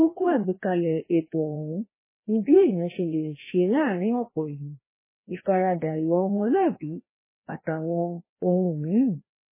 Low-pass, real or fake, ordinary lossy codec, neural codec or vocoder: 3.6 kHz; fake; MP3, 16 kbps; codec, 16 kHz, 1 kbps, FreqCodec, larger model